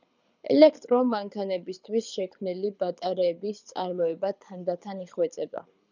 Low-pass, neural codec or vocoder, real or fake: 7.2 kHz; codec, 24 kHz, 6 kbps, HILCodec; fake